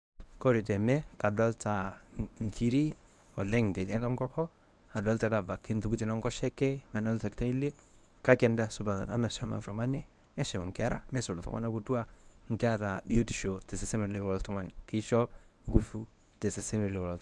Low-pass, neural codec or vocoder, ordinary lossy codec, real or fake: none; codec, 24 kHz, 0.9 kbps, WavTokenizer, medium speech release version 1; none; fake